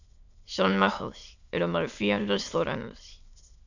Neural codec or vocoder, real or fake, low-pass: autoencoder, 22.05 kHz, a latent of 192 numbers a frame, VITS, trained on many speakers; fake; 7.2 kHz